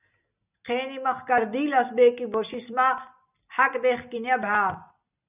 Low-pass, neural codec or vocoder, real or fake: 3.6 kHz; none; real